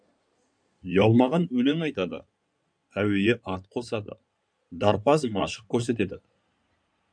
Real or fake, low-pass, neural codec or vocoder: fake; 9.9 kHz; codec, 16 kHz in and 24 kHz out, 2.2 kbps, FireRedTTS-2 codec